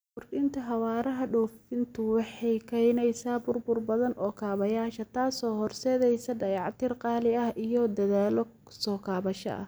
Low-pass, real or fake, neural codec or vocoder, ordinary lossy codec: none; real; none; none